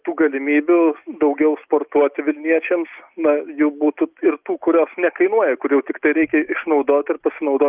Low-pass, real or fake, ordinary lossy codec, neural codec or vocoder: 3.6 kHz; real; Opus, 32 kbps; none